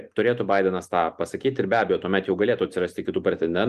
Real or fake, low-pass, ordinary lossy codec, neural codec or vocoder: real; 14.4 kHz; Opus, 32 kbps; none